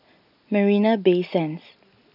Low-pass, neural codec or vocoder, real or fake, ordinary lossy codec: 5.4 kHz; none; real; none